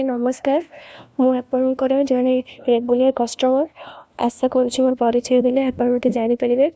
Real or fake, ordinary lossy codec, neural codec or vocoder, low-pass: fake; none; codec, 16 kHz, 1 kbps, FunCodec, trained on LibriTTS, 50 frames a second; none